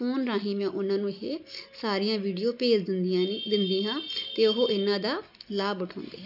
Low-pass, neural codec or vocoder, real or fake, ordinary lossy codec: 5.4 kHz; none; real; none